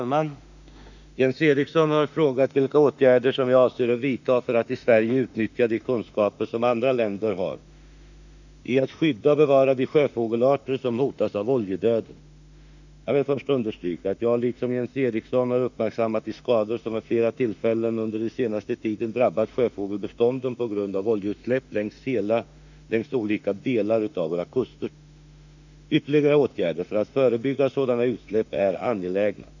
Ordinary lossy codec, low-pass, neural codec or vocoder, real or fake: none; 7.2 kHz; autoencoder, 48 kHz, 32 numbers a frame, DAC-VAE, trained on Japanese speech; fake